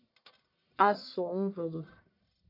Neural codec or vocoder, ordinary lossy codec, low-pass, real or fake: codec, 44.1 kHz, 1.7 kbps, Pupu-Codec; AAC, 32 kbps; 5.4 kHz; fake